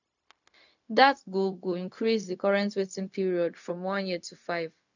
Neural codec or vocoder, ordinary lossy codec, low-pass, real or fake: codec, 16 kHz, 0.4 kbps, LongCat-Audio-Codec; none; 7.2 kHz; fake